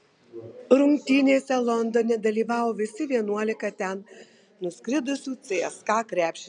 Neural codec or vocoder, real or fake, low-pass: none; real; 9.9 kHz